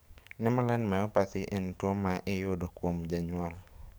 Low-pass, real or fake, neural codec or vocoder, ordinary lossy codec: none; fake; codec, 44.1 kHz, 7.8 kbps, DAC; none